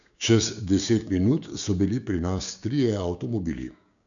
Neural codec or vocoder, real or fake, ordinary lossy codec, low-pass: codec, 16 kHz, 6 kbps, DAC; fake; none; 7.2 kHz